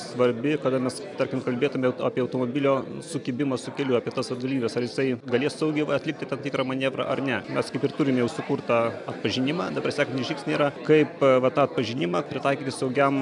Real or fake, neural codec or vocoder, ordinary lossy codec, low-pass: real; none; MP3, 96 kbps; 10.8 kHz